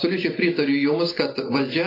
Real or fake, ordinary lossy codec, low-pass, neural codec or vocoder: real; AAC, 24 kbps; 5.4 kHz; none